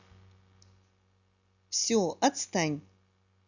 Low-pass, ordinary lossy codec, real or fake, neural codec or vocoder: 7.2 kHz; none; real; none